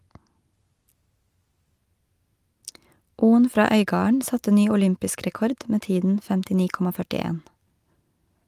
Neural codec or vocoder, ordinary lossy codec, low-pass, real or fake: none; Opus, 32 kbps; 14.4 kHz; real